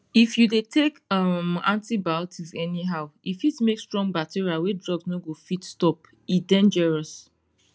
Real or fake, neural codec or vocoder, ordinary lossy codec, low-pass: real; none; none; none